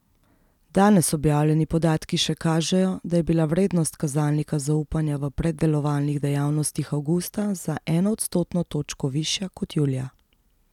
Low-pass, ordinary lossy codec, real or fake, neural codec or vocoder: 19.8 kHz; none; real; none